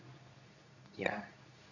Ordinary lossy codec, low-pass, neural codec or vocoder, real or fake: none; 7.2 kHz; codec, 24 kHz, 0.9 kbps, WavTokenizer, medium speech release version 2; fake